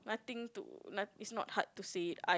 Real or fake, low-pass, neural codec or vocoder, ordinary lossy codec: real; none; none; none